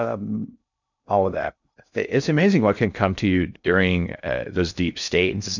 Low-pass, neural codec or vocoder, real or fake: 7.2 kHz; codec, 16 kHz in and 24 kHz out, 0.6 kbps, FocalCodec, streaming, 2048 codes; fake